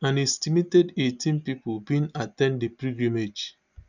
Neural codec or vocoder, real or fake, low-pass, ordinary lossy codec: none; real; 7.2 kHz; none